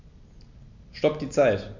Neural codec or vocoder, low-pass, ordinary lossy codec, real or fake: none; 7.2 kHz; MP3, 64 kbps; real